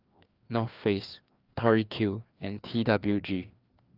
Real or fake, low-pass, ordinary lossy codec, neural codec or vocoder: fake; 5.4 kHz; Opus, 24 kbps; codec, 16 kHz, 2 kbps, FreqCodec, larger model